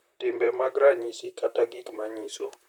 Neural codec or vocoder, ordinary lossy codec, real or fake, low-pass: vocoder, 44.1 kHz, 128 mel bands, Pupu-Vocoder; none; fake; 19.8 kHz